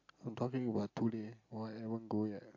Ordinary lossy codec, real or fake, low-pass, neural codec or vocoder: AAC, 48 kbps; real; 7.2 kHz; none